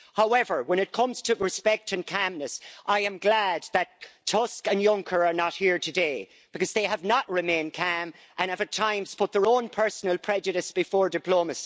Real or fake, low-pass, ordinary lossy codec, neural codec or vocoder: real; none; none; none